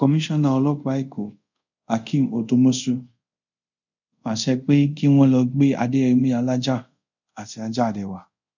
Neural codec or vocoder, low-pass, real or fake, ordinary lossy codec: codec, 24 kHz, 0.5 kbps, DualCodec; 7.2 kHz; fake; none